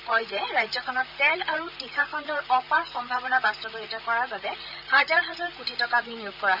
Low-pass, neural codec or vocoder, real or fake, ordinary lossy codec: 5.4 kHz; vocoder, 44.1 kHz, 128 mel bands, Pupu-Vocoder; fake; none